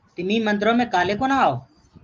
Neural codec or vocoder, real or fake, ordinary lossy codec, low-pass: none; real; Opus, 24 kbps; 7.2 kHz